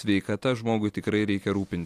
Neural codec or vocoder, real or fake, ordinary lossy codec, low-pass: none; real; AAC, 64 kbps; 14.4 kHz